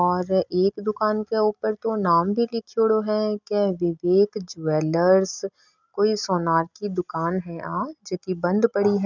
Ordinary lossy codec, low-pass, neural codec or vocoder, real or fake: none; 7.2 kHz; none; real